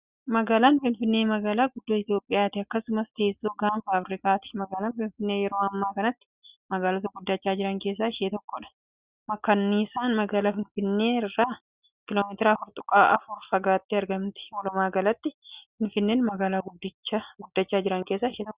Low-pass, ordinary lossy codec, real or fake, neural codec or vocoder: 3.6 kHz; Opus, 64 kbps; real; none